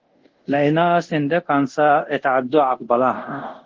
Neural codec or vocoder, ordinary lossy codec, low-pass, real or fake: codec, 24 kHz, 0.5 kbps, DualCodec; Opus, 16 kbps; 7.2 kHz; fake